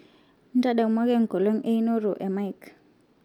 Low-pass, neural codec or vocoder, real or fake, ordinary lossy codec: 19.8 kHz; none; real; none